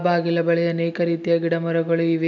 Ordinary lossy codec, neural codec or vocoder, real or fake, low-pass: none; none; real; 7.2 kHz